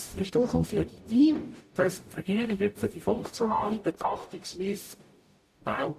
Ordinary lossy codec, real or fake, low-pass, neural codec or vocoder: AAC, 64 kbps; fake; 14.4 kHz; codec, 44.1 kHz, 0.9 kbps, DAC